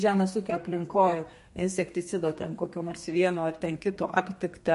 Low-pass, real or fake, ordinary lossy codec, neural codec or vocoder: 14.4 kHz; fake; MP3, 48 kbps; codec, 32 kHz, 1.9 kbps, SNAC